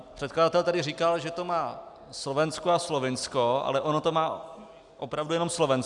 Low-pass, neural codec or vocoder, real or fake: 10.8 kHz; none; real